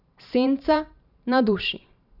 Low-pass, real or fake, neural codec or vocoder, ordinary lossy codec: 5.4 kHz; fake; vocoder, 44.1 kHz, 128 mel bands every 512 samples, BigVGAN v2; none